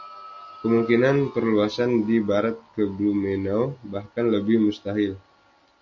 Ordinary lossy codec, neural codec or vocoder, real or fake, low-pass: MP3, 48 kbps; none; real; 7.2 kHz